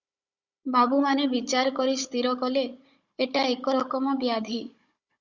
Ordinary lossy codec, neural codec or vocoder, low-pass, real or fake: Opus, 64 kbps; codec, 16 kHz, 16 kbps, FunCodec, trained on Chinese and English, 50 frames a second; 7.2 kHz; fake